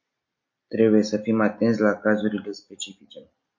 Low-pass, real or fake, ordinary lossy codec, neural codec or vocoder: 7.2 kHz; real; MP3, 48 kbps; none